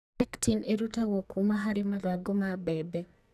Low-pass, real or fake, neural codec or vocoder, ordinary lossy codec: 14.4 kHz; fake; codec, 44.1 kHz, 2.6 kbps, SNAC; none